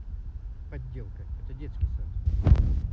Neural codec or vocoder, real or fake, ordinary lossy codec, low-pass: none; real; none; none